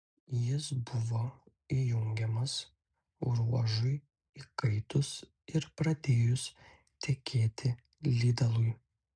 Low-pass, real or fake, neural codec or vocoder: 9.9 kHz; real; none